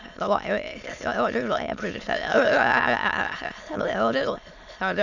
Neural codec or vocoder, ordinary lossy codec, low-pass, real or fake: autoencoder, 22.05 kHz, a latent of 192 numbers a frame, VITS, trained on many speakers; none; 7.2 kHz; fake